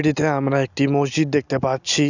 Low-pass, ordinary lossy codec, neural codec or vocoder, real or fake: 7.2 kHz; none; none; real